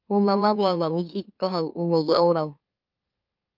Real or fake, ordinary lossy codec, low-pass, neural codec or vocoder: fake; Opus, 24 kbps; 5.4 kHz; autoencoder, 44.1 kHz, a latent of 192 numbers a frame, MeloTTS